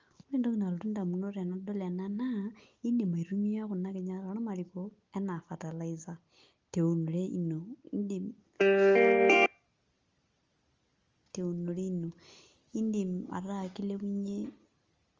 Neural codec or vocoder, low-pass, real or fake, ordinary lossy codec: none; 7.2 kHz; real; Opus, 32 kbps